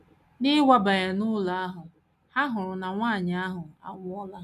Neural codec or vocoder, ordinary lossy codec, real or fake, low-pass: none; none; real; 14.4 kHz